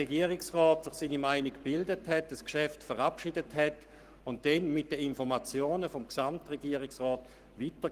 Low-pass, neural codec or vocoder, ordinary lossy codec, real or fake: 14.4 kHz; codec, 44.1 kHz, 7.8 kbps, Pupu-Codec; Opus, 24 kbps; fake